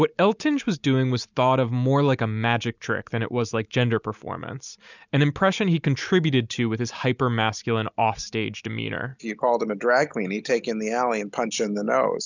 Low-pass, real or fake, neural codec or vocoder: 7.2 kHz; real; none